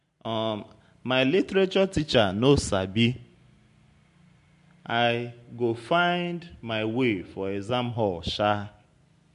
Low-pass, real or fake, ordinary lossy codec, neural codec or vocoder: 10.8 kHz; real; MP3, 64 kbps; none